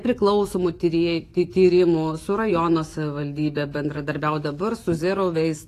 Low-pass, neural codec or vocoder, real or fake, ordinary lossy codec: 14.4 kHz; autoencoder, 48 kHz, 128 numbers a frame, DAC-VAE, trained on Japanese speech; fake; AAC, 48 kbps